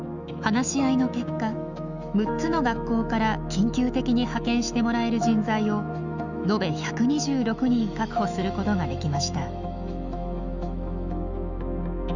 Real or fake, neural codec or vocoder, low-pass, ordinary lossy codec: fake; autoencoder, 48 kHz, 128 numbers a frame, DAC-VAE, trained on Japanese speech; 7.2 kHz; none